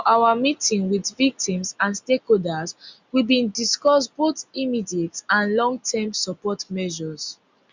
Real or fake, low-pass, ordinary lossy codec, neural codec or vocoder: real; 7.2 kHz; Opus, 64 kbps; none